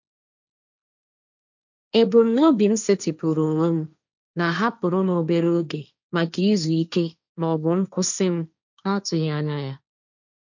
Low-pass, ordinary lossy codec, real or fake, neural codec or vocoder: 7.2 kHz; none; fake; codec, 16 kHz, 1.1 kbps, Voila-Tokenizer